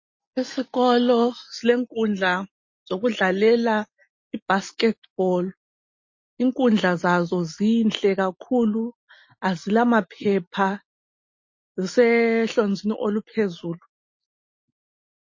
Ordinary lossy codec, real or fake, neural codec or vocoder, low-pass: MP3, 32 kbps; real; none; 7.2 kHz